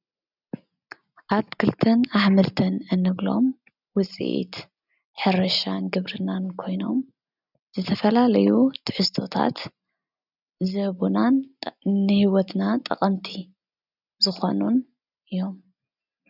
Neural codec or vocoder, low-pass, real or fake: none; 5.4 kHz; real